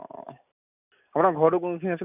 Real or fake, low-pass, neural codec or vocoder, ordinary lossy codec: real; 3.6 kHz; none; none